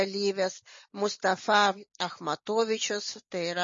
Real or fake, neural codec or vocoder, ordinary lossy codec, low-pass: real; none; MP3, 32 kbps; 7.2 kHz